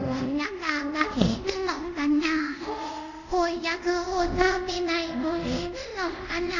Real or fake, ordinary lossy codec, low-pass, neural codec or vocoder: fake; none; 7.2 kHz; codec, 24 kHz, 0.5 kbps, DualCodec